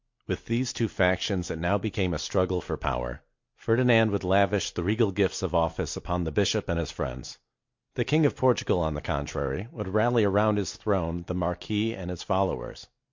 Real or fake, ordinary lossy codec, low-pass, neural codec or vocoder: real; MP3, 48 kbps; 7.2 kHz; none